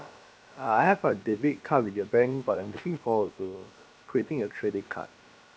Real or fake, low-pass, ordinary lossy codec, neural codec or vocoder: fake; none; none; codec, 16 kHz, about 1 kbps, DyCAST, with the encoder's durations